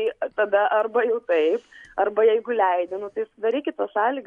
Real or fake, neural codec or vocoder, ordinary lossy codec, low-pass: real; none; MP3, 64 kbps; 10.8 kHz